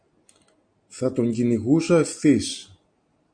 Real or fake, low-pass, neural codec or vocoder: real; 9.9 kHz; none